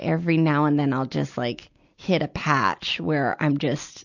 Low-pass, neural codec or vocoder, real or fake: 7.2 kHz; none; real